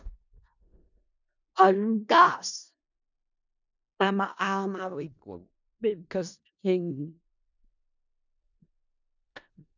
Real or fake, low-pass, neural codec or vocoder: fake; 7.2 kHz; codec, 16 kHz in and 24 kHz out, 0.4 kbps, LongCat-Audio-Codec, four codebook decoder